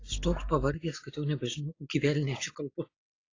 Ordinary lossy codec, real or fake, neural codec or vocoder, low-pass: AAC, 32 kbps; real; none; 7.2 kHz